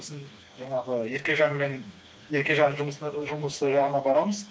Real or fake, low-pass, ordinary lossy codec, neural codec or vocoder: fake; none; none; codec, 16 kHz, 2 kbps, FreqCodec, smaller model